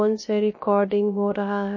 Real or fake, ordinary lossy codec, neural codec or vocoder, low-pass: fake; MP3, 32 kbps; codec, 16 kHz, 0.3 kbps, FocalCodec; 7.2 kHz